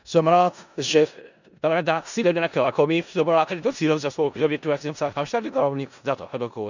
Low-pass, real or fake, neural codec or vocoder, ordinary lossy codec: 7.2 kHz; fake; codec, 16 kHz in and 24 kHz out, 0.4 kbps, LongCat-Audio-Codec, four codebook decoder; none